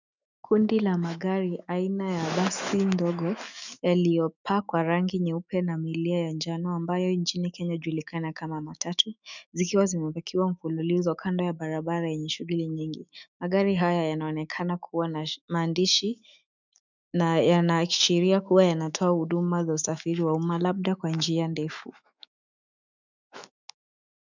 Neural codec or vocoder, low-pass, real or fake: autoencoder, 48 kHz, 128 numbers a frame, DAC-VAE, trained on Japanese speech; 7.2 kHz; fake